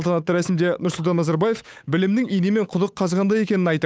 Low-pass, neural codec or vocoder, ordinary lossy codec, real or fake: none; codec, 16 kHz, 8 kbps, FunCodec, trained on Chinese and English, 25 frames a second; none; fake